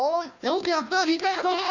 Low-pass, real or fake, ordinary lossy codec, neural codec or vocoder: 7.2 kHz; fake; none; codec, 16 kHz, 1 kbps, FunCodec, trained on Chinese and English, 50 frames a second